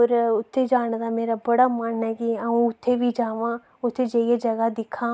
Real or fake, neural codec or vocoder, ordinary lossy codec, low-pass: real; none; none; none